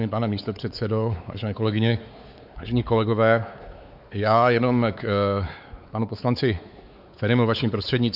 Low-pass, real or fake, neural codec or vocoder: 5.4 kHz; fake; codec, 16 kHz, 4 kbps, X-Codec, WavLM features, trained on Multilingual LibriSpeech